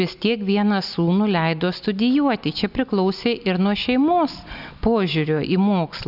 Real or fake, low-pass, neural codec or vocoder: real; 5.4 kHz; none